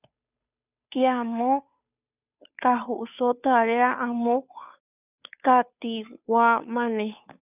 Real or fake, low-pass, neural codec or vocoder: fake; 3.6 kHz; codec, 16 kHz, 2 kbps, FunCodec, trained on Chinese and English, 25 frames a second